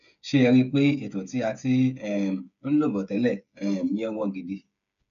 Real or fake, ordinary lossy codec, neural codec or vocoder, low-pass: fake; none; codec, 16 kHz, 8 kbps, FreqCodec, smaller model; 7.2 kHz